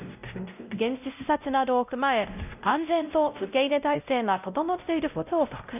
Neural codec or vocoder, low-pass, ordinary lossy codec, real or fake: codec, 16 kHz, 0.5 kbps, X-Codec, WavLM features, trained on Multilingual LibriSpeech; 3.6 kHz; none; fake